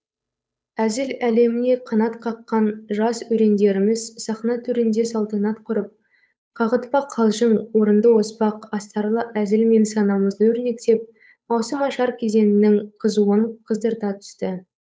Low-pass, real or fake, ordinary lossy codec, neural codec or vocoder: none; fake; none; codec, 16 kHz, 8 kbps, FunCodec, trained on Chinese and English, 25 frames a second